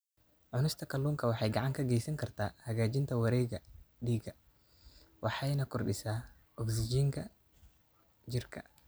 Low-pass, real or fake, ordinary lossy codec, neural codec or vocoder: none; real; none; none